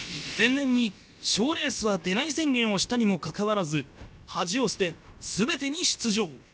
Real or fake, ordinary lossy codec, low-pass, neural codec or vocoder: fake; none; none; codec, 16 kHz, about 1 kbps, DyCAST, with the encoder's durations